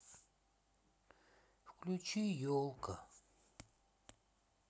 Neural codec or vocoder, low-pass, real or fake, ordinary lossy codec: none; none; real; none